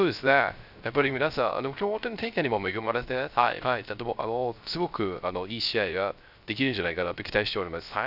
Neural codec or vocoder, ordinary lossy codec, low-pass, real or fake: codec, 16 kHz, 0.3 kbps, FocalCodec; none; 5.4 kHz; fake